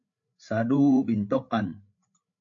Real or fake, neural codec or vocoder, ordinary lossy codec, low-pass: fake; codec, 16 kHz, 8 kbps, FreqCodec, larger model; AAC, 48 kbps; 7.2 kHz